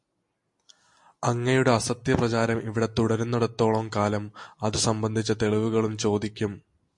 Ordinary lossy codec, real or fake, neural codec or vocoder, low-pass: MP3, 48 kbps; real; none; 10.8 kHz